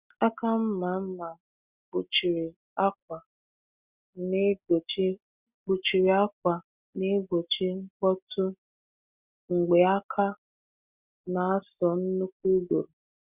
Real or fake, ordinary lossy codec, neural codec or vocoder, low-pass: real; Opus, 64 kbps; none; 3.6 kHz